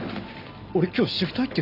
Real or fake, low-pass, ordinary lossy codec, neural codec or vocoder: real; 5.4 kHz; none; none